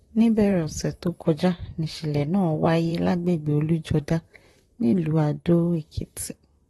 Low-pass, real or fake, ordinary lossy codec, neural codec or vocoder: 19.8 kHz; fake; AAC, 32 kbps; vocoder, 44.1 kHz, 128 mel bands, Pupu-Vocoder